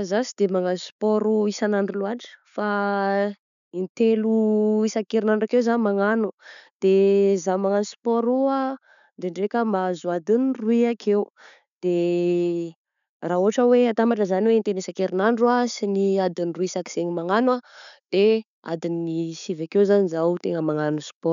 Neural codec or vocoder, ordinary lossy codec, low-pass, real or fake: none; none; 7.2 kHz; real